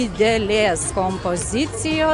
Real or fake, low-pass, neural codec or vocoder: real; 10.8 kHz; none